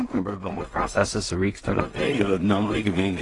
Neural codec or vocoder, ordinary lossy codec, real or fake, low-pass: codec, 16 kHz in and 24 kHz out, 0.4 kbps, LongCat-Audio-Codec, two codebook decoder; AAC, 32 kbps; fake; 10.8 kHz